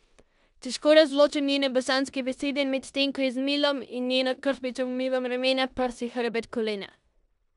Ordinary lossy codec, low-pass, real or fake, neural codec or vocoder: none; 10.8 kHz; fake; codec, 16 kHz in and 24 kHz out, 0.9 kbps, LongCat-Audio-Codec, four codebook decoder